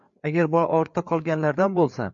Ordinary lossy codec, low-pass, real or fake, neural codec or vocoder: MP3, 48 kbps; 7.2 kHz; fake; codec, 16 kHz, 4 kbps, FreqCodec, larger model